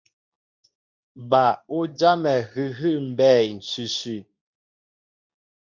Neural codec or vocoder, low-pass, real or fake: codec, 24 kHz, 0.9 kbps, WavTokenizer, medium speech release version 2; 7.2 kHz; fake